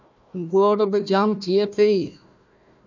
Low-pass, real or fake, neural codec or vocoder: 7.2 kHz; fake; codec, 16 kHz, 1 kbps, FunCodec, trained on Chinese and English, 50 frames a second